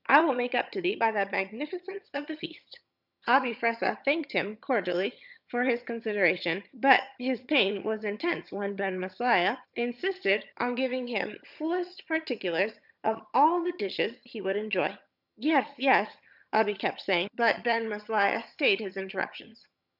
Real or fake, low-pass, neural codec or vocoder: fake; 5.4 kHz; vocoder, 22.05 kHz, 80 mel bands, HiFi-GAN